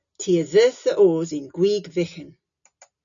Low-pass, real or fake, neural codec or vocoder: 7.2 kHz; real; none